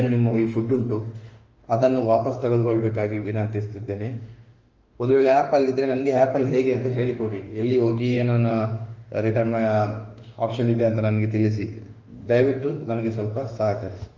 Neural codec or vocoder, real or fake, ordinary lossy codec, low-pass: autoencoder, 48 kHz, 32 numbers a frame, DAC-VAE, trained on Japanese speech; fake; Opus, 24 kbps; 7.2 kHz